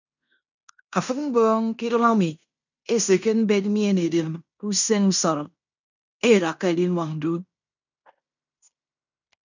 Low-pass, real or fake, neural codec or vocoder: 7.2 kHz; fake; codec, 16 kHz in and 24 kHz out, 0.9 kbps, LongCat-Audio-Codec, fine tuned four codebook decoder